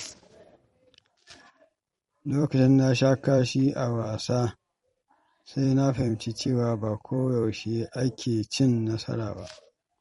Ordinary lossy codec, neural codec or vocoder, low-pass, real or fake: MP3, 48 kbps; vocoder, 44.1 kHz, 128 mel bands every 256 samples, BigVGAN v2; 19.8 kHz; fake